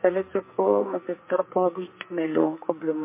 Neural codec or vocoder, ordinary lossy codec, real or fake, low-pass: codec, 44.1 kHz, 2.6 kbps, SNAC; MP3, 24 kbps; fake; 3.6 kHz